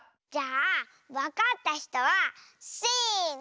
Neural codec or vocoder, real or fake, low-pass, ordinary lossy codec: none; real; none; none